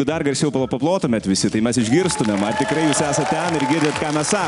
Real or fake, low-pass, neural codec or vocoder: real; 10.8 kHz; none